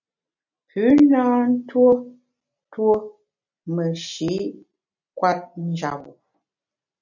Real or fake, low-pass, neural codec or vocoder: real; 7.2 kHz; none